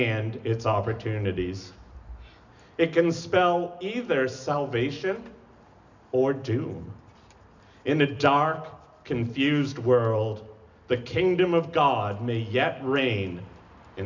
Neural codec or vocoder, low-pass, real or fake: none; 7.2 kHz; real